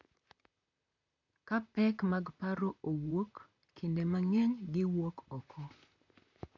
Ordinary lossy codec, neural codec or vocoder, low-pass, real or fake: AAC, 48 kbps; vocoder, 44.1 kHz, 128 mel bands, Pupu-Vocoder; 7.2 kHz; fake